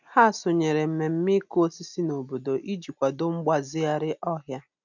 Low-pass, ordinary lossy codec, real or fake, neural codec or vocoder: 7.2 kHz; none; real; none